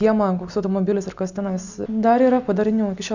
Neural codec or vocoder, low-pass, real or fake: none; 7.2 kHz; real